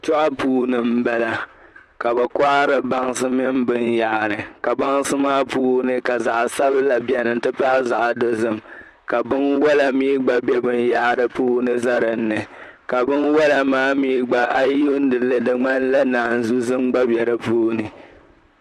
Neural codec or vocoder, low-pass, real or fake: vocoder, 44.1 kHz, 128 mel bands, Pupu-Vocoder; 14.4 kHz; fake